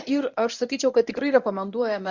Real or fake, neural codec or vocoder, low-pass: fake; codec, 24 kHz, 0.9 kbps, WavTokenizer, medium speech release version 1; 7.2 kHz